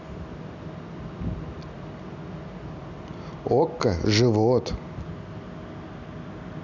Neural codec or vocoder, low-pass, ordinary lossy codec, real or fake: none; 7.2 kHz; none; real